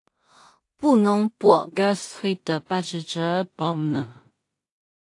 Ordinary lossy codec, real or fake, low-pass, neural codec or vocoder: AAC, 48 kbps; fake; 10.8 kHz; codec, 16 kHz in and 24 kHz out, 0.4 kbps, LongCat-Audio-Codec, two codebook decoder